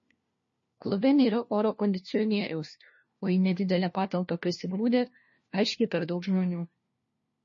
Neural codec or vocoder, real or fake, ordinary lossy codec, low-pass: codec, 16 kHz, 1 kbps, FunCodec, trained on LibriTTS, 50 frames a second; fake; MP3, 32 kbps; 7.2 kHz